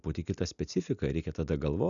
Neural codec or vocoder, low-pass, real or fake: none; 7.2 kHz; real